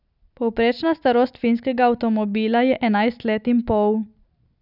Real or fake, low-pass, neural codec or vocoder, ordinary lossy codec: real; 5.4 kHz; none; none